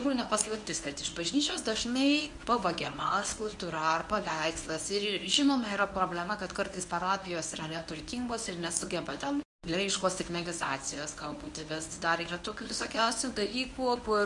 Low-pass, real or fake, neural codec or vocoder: 10.8 kHz; fake; codec, 24 kHz, 0.9 kbps, WavTokenizer, medium speech release version 1